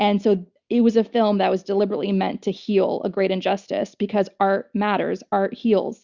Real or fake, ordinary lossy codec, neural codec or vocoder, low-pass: real; Opus, 64 kbps; none; 7.2 kHz